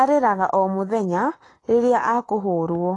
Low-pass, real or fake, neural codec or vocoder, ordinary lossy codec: 10.8 kHz; fake; codec, 44.1 kHz, 7.8 kbps, Pupu-Codec; AAC, 32 kbps